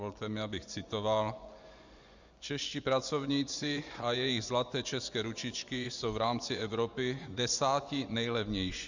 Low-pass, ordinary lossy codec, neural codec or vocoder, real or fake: 7.2 kHz; Opus, 64 kbps; vocoder, 24 kHz, 100 mel bands, Vocos; fake